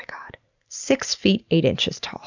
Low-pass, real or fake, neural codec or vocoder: 7.2 kHz; real; none